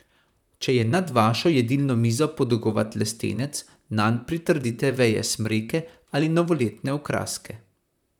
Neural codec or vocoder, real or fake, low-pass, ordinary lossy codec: vocoder, 44.1 kHz, 128 mel bands, Pupu-Vocoder; fake; 19.8 kHz; none